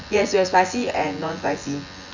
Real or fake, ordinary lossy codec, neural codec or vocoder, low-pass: fake; none; vocoder, 24 kHz, 100 mel bands, Vocos; 7.2 kHz